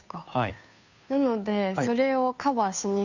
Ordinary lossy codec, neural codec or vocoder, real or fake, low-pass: none; codec, 16 kHz, 2 kbps, FunCodec, trained on Chinese and English, 25 frames a second; fake; 7.2 kHz